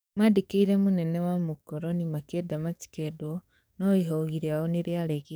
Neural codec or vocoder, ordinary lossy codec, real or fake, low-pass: codec, 44.1 kHz, 7.8 kbps, DAC; none; fake; none